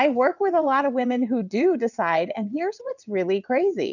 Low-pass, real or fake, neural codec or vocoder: 7.2 kHz; fake; vocoder, 22.05 kHz, 80 mel bands, WaveNeXt